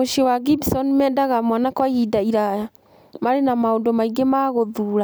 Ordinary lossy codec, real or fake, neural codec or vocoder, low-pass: none; real; none; none